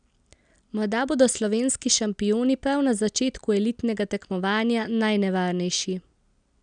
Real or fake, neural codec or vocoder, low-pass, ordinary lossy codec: real; none; 9.9 kHz; none